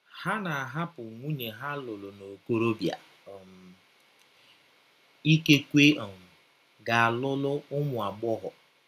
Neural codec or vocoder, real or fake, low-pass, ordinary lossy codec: none; real; 14.4 kHz; none